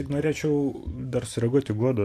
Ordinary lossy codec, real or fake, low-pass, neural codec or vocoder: AAC, 64 kbps; fake; 14.4 kHz; vocoder, 44.1 kHz, 128 mel bands every 512 samples, BigVGAN v2